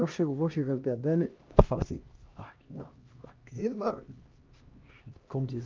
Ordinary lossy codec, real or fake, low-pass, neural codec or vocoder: Opus, 32 kbps; fake; 7.2 kHz; codec, 16 kHz, 1 kbps, X-Codec, HuBERT features, trained on LibriSpeech